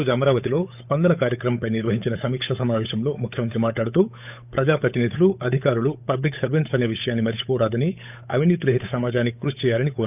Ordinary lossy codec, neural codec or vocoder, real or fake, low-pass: none; codec, 16 kHz, 8 kbps, FunCodec, trained on Chinese and English, 25 frames a second; fake; 3.6 kHz